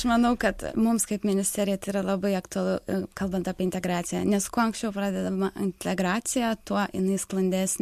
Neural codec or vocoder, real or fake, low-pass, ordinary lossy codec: none; real; 14.4 kHz; MP3, 64 kbps